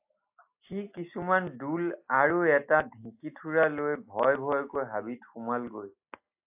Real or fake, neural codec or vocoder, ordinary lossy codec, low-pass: real; none; AAC, 32 kbps; 3.6 kHz